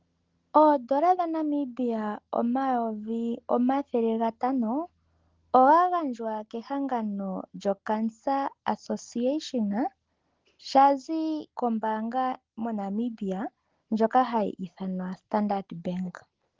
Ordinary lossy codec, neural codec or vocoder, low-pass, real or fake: Opus, 16 kbps; none; 7.2 kHz; real